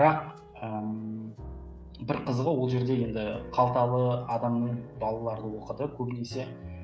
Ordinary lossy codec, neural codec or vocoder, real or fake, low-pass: none; codec, 16 kHz, 16 kbps, FreqCodec, smaller model; fake; none